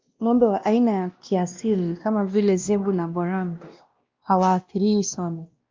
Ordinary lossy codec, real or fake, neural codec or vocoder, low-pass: Opus, 32 kbps; fake; codec, 16 kHz, 1 kbps, X-Codec, WavLM features, trained on Multilingual LibriSpeech; 7.2 kHz